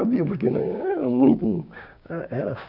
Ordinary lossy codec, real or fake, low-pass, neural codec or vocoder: none; fake; 5.4 kHz; codec, 16 kHz, 6 kbps, DAC